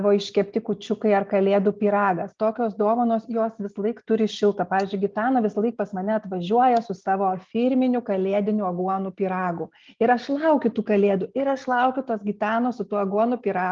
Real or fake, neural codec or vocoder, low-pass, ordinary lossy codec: real; none; 7.2 kHz; Opus, 32 kbps